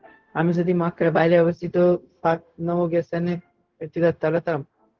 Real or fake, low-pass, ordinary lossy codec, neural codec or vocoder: fake; 7.2 kHz; Opus, 16 kbps; codec, 16 kHz, 0.4 kbps, LongCat-Audio-Codec